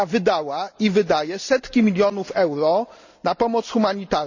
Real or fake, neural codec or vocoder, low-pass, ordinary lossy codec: real; none; 7.2 kHz; none